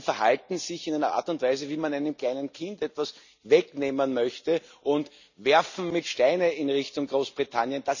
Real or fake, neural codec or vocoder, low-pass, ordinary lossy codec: real; none; 7.2 kHz; none